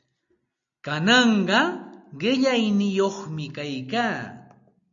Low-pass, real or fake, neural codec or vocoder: 7.2 kHz; real; none